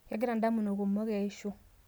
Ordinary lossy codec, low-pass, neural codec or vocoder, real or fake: none; none; none; real